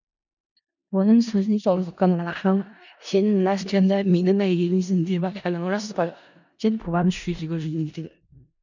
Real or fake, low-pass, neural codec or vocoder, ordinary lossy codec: fake; 7.2 kHz; codec, 16 kHz in and 24 kHz out, 0.4 kbps, LongCat-Audio-Codec, four codebook decoder; none